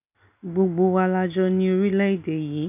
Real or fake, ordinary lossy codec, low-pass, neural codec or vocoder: real; none; 3.6 kHz; none